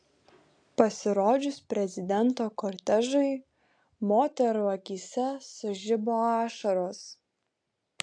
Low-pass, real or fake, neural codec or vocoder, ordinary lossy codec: 9.9 kHz; real; none; AAC, 64 kbps